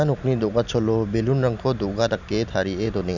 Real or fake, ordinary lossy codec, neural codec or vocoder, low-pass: real; none; none; 7.2 kHz